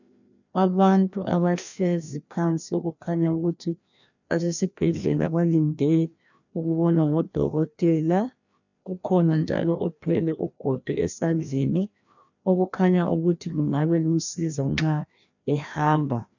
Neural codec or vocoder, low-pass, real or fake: codec, 16 kHz, 1 kbps, FreqCodec, larger model; 7.2 kHz; fake